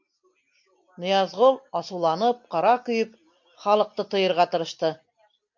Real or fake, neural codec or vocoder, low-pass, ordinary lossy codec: real; none; 7.2 kHz; MP3, 48 kbps